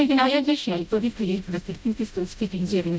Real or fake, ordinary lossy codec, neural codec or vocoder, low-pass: fake; none; codec, 16 kHz, 0.5 kbps, FreqCodec, smaller model; none